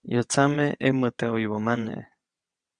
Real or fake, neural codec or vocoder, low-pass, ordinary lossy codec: fake; vocoder, 22.05 kHz, 80 mel bands, WaveNeXt; 9.9 kHz; Opus, 64 kbps